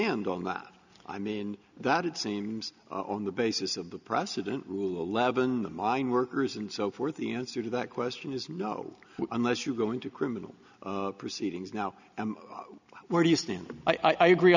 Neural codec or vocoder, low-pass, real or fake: none; 7.2 kHz; real